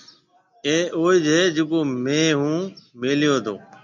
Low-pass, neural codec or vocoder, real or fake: 7.2 kHz; none; real